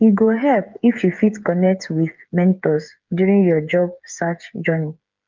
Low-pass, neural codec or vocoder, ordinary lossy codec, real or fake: 7.2 kHz; codec, 16 kHz, 8 kbps, FreqCodec, smaller model; Opus, 24 kbps; fake